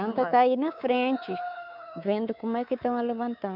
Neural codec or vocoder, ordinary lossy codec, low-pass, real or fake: codec, 24 kHz, 3.1 kbps, DualCodec; none; 5.4 kHz; fake